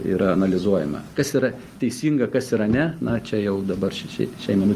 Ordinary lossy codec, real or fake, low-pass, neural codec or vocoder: Opus, 32 kbps; real; 14.4 kHz; none